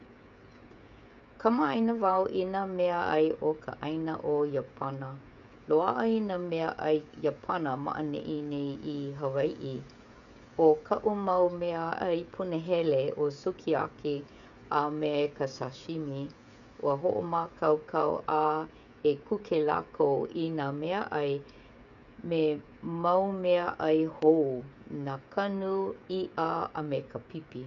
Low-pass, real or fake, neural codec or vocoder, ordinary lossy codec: 7.2 kHz; fake; codec, 16 kHz, 16 kbps, FreqCodec, smaller model; none